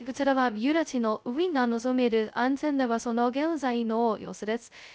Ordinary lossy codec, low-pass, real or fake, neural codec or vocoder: none; none; fake; codec, 16 kHz, 0.2 kbps, FocalCodec